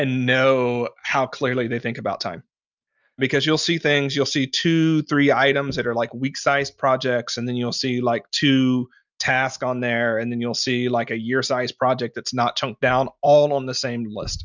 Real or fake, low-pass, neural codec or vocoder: real; 7.2 kHz; none